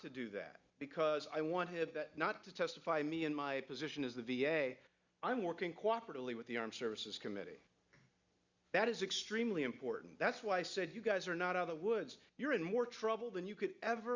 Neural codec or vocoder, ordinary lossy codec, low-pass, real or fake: none; Opus, 64 kbps; 7.2 kHz; real